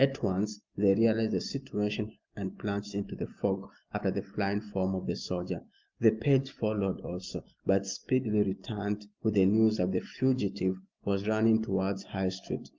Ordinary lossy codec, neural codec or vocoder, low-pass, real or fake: Opus, 24 kbps; none; 7.2 kHz; real